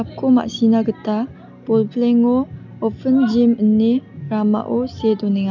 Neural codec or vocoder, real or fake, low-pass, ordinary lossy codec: none; real; 7.2 kHz; none